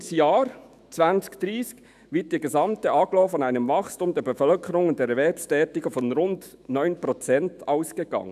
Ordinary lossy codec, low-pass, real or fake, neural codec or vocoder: none; 14.4 kHz; fake; autoencoder, 48 kHz, 128 numbers a frame, DAC-VAE, trained on Japanese speech